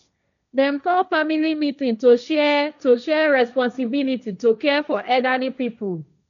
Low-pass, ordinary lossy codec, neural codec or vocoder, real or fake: 7.2 kHz; none; codec, 16 kHz, 1.1 kbps, Voila-Tokenizer; fake